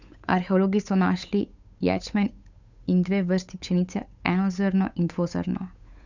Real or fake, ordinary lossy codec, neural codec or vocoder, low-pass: fake; none; codec, 16 kHz, 8 kbps, FunCodec, trained on Chinese and English, 25 frames a second; 7.2 kHz